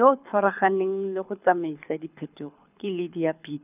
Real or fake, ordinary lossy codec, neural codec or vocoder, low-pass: fake; none; codec, 24 kHz, 6 kbps, HILCodec; 3.6 kHz